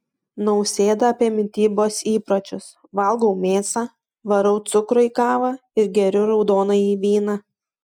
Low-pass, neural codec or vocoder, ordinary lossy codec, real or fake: 19.8 kHz; none; MP3, 96 kbps; real